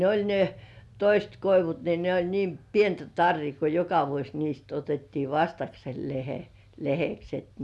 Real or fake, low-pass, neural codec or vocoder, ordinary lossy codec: real; none; none; none